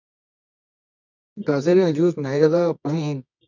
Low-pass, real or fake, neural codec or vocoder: 7.2 kHz; fake; codec, 24 kHz, 0.9 kbps, WavTokenizer, medium music audio release